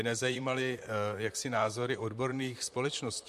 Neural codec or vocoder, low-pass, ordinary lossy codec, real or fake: vocoder, 44.1 kHz, 128 mel bands, Pupu-Vocoder; 14.4 kHz; MP3, 64 kbps; fake